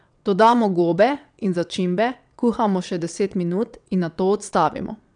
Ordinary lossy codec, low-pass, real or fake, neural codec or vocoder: none; 9.9 kHz; fake; vocoder, 22.05 kHz, 80 mel bands, WaveNeXt